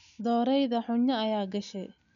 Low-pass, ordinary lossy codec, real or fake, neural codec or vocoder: 7.2 kHz; none; real; none